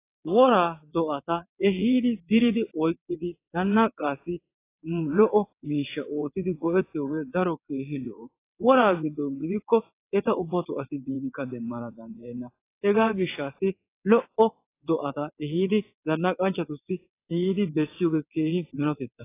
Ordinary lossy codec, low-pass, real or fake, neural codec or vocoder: AAC, 24 kbps; 3.6 kHz; fake; vocoder, 22.05 kHz, 80 mel bands, WaveNeXt